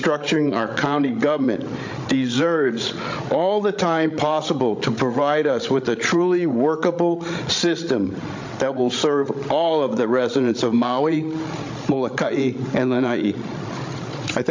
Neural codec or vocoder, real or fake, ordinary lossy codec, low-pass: codec, 16 kHz, 16 kbps, FreqCodec, larger model; fake; MP3, 48 kbps; 7.2 kHz